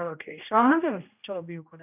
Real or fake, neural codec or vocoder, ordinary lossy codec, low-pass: fake; codec, 16 kHz, 1 kbps, X-Codec, HuBERT features, trained on general audio; none; 3.6 kHz